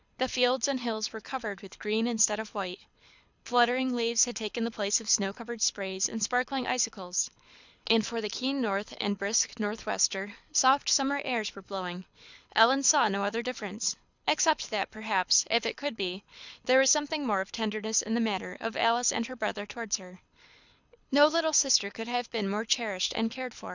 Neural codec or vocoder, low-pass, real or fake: codec, 24 kHz, 6 kbps, HILCodec; 7.2 kHz; fake